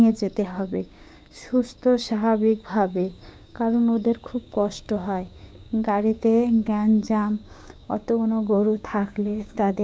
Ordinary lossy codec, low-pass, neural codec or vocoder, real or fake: none; none; codec, 16 kHz, 6 kbps, DAC; fake